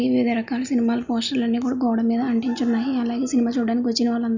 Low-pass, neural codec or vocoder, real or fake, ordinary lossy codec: 7.2 kHz; none; real; none